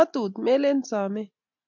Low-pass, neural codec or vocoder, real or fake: 7.2 kHz; none; real